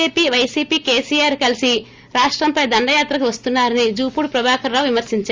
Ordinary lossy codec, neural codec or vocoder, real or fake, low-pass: Opus, 32 kbps; none; real; 7.2 kHz